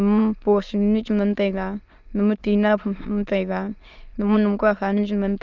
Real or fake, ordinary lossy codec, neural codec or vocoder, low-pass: fake; Opus, 24 kbps; autoencoder, 22.05 kHz, a latent of 192 numbers a frame, VITS, trained on many speakers; 7.2 kHz